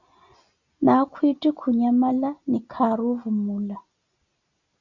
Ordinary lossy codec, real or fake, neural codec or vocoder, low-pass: Opus, 64 kbps; real; none; 7.2 kHz